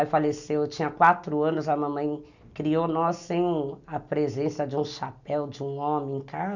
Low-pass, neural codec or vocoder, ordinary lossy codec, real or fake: 7.2 kHz; none; none; real